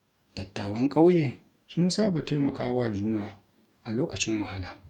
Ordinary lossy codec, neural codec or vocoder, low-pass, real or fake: none; codec, 44.1 kHz, 2.6 kbps, DAC; 19.8 kHz; fake